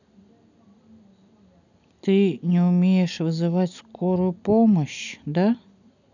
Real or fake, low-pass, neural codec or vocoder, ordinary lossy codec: real; 7.2 kHz; none; none